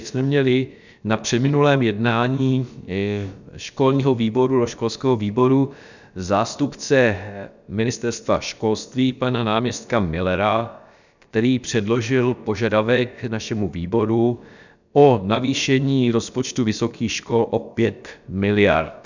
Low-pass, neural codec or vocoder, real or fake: 7.2 kHz; codec, 16 kHz, about 1 kbps, DyCAST, with the encoder's durations; fake